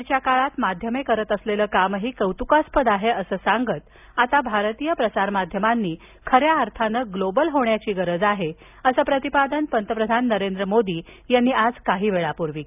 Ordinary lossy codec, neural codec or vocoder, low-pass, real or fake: none; none; 3.6 kHz; real